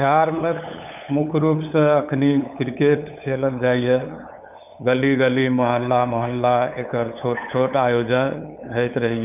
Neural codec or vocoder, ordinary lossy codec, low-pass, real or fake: codec, 16 kHz, 8 kbps, FunCodec, trained on LibriTTS, 25 frames a second; none; 3.6 kHz; fake